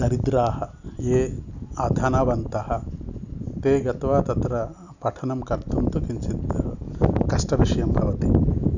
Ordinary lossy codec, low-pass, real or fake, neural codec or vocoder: none; 7.2 kHz; real; none